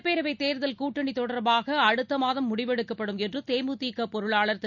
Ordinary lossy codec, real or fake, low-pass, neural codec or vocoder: none; real; 7.2 kHz; none